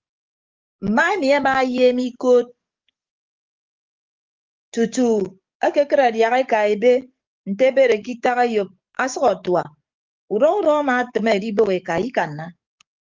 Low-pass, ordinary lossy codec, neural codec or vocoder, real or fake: 7.2 kHz; Opus, 24 kbps; codec, 44.1 kHz, 7.8 kbps, DAC; fake